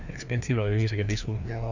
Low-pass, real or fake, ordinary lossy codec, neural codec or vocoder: 7.2 kHz; fake; none; codec, 16 kHz, 2 kbps, FreqCodec, larger model